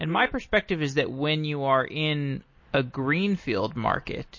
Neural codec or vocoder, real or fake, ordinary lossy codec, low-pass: none; real; MP3, 32 kbps; 7.2 kHz